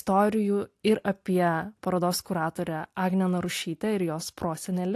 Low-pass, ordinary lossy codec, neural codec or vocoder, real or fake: 14.4 kHz; AAC, 64 kbps; none; real